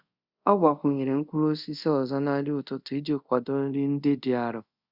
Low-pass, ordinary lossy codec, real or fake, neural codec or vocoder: 5.4 kHz; Opus, 64 kbps; fake; codec, 24 kHz, 0.5 kbps, DualCodec